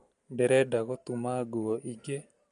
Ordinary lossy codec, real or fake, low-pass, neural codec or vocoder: MP3, 48 kbps; real; 9.9 kHz; none